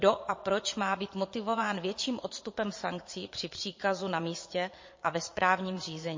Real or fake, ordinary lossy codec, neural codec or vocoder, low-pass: fake; MP3, 32 kbps; vocoder, 22.05 kHz, 80 mel bands, Vocos; 7.2 kHz